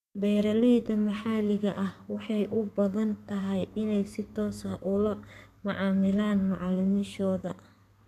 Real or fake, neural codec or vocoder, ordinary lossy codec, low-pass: fake; codec, 32 kHz, 1.9 kbps, SNAC; none; 14.4 kHz